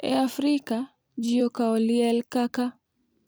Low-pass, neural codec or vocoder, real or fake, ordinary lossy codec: none; vocoder, 44.1 kHz, 128 mel bands every 256 samples, BigVGAN v2; fake; none